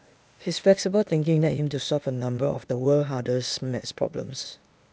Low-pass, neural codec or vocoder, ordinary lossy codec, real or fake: none; codec, 16 kHz, 0.8 kbps, ZipCodec; none; fake